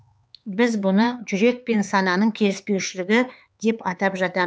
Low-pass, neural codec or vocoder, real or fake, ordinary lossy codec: none; codec, 16 kHz, 4 kbps, X-Codec, HuBERT features, trained on LibriSpeech; fake; none